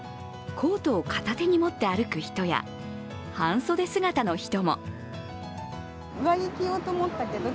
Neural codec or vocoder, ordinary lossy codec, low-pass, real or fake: none; none; none; real